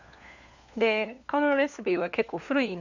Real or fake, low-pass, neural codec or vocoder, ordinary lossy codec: fake; 7.2 kHz; codec, 16 kHz, 4 kbps, FunCodec, trained on LibriTTS, 50 frames a second; none